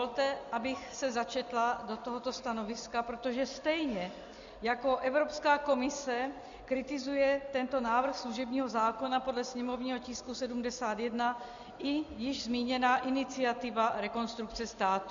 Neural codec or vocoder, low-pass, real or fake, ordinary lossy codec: none; 7.2 kHz; real; Opus, 64 kbps